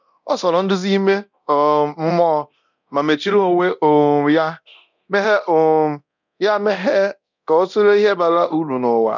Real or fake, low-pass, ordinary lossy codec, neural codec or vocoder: fake; 7.2 kHz; none; codec, 24 kHz, 0.9 kbps, DualCodec